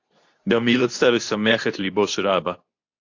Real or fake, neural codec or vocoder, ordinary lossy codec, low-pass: fake; codec, 24 kHz, 0.9 kbps, WavTokenizer, medium speech release version 2; AAC, 48 kbps; 7.2 kHz